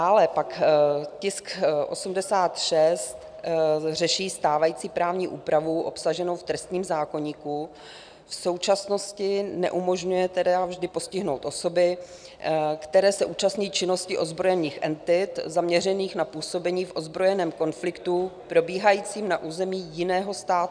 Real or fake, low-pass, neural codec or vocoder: real; 9.9 kHz; none